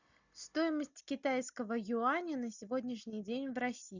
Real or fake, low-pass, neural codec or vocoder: real; 7.2 kHz; none